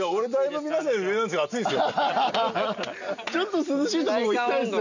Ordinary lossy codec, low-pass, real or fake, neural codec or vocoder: none; 7.2 kHz; real; none